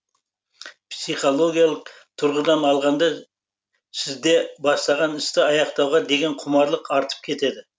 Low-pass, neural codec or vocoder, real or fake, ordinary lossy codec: none; none; real; none